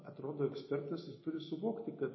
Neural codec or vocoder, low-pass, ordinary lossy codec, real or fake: none; 7.2 kHz; MP3, 24 kbps; real